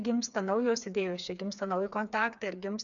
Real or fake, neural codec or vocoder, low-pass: fake; codec, 16 kHz, 4 kbps, FreqCodec, smaller model; 7.2 kHz